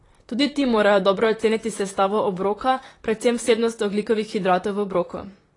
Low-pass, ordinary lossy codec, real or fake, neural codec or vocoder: 10.8 kHz; AAC, 32 kbps; fake; vocoder, 44.1 kHz, 128 mel bands, Pupu-Vocoder